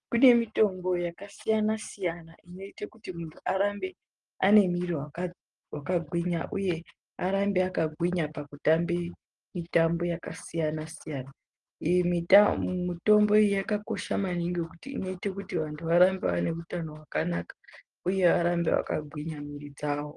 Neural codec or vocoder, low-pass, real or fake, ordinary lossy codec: none; 10.8 kHz; real; Opus, 24 kbps